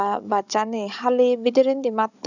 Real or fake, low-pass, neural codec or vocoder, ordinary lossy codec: fake; 7.2 kHz; codec, 16 kHz, 16 kbps, FunCodec, trained on Chinese and English, 50 frames a second; none